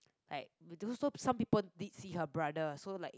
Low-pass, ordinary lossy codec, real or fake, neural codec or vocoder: none; none; real; none